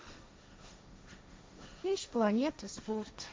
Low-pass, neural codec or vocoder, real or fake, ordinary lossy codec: none; codec, 16 kHz, 1.1 kbps, Voila-Tokenizer; fake; none